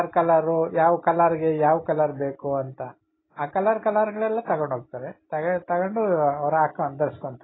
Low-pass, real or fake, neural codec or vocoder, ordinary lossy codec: 7.2 kHz; real; none; AAC, 16 kbps